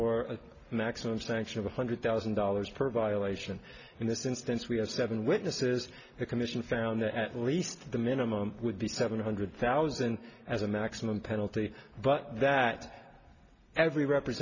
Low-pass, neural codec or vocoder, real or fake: 7.2 kHz; none; real